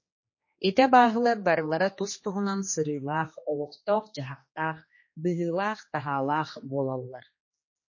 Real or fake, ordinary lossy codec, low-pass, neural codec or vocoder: fake; MP3, 32 kbps; 7.2 kHz; codec, 16 kHz, 2 kbps, X-Codec, HuBERT features, trained on general audio